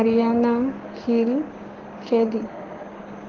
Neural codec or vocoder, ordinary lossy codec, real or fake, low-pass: codec, 16 kHz, 6 kbps, DAC; Opus, 16 kbps; fake; 7.2 kHz